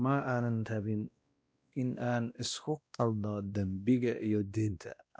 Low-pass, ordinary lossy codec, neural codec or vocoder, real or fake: none; none; codec, 16 kHz, 1 kbps, X-Codec, WavLM features, trained on Multilingual LibriSpeech; fake